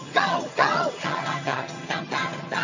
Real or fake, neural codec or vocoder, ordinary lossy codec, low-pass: fake; vocoder, 22.05 kHz, 80 mel bands, HiFi-GAN; AAC, 48 kbps; 7.2 kHz